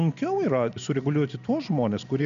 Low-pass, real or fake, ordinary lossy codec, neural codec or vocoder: 7.2 kHz; real; MP3, 96 kbps; none